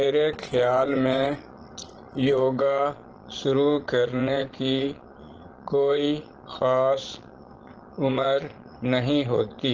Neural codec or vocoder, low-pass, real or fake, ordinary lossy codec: vocoder, 22.05 kHz, 80 mel bands, Vocos; 7.2 kHz; fake; Opus, 16 kbps